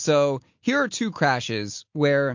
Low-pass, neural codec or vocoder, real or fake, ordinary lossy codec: 7.2 kHz; none; real; MP3, 48 kbps